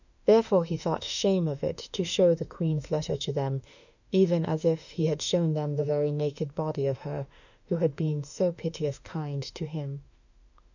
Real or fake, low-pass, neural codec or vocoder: fake; 7.2 kHz; autoencoder, 48 kHz, 32 numbers a frame, DAC-VAE, trained on Japanese speech